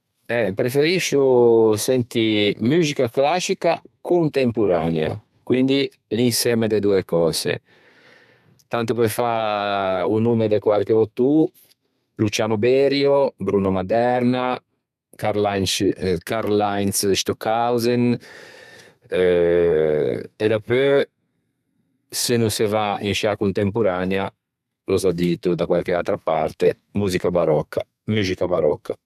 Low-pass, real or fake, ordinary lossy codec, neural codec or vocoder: 14.4 kHz; fake; none; codec, 32 kHz, 1.9 kbps, SNAC